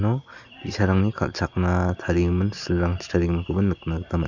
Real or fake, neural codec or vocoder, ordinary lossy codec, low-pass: real; none; none; 7.2 kHz